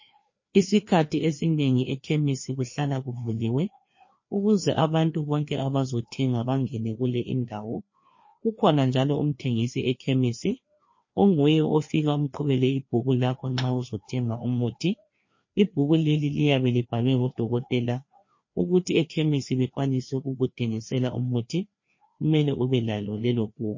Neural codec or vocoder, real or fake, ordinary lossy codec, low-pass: codec, 16 kHz, 2 kbps, FreqCodec, larger model; fake; MP3, 32 kbps; 7.2 kHz